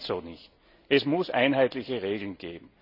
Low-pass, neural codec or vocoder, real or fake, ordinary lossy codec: 5.4 kHz; none; real; none